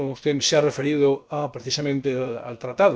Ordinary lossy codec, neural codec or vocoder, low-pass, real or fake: none; codec, 16 kHz, about 1 kbps, DyCAST, with the encoder's durations; none; fake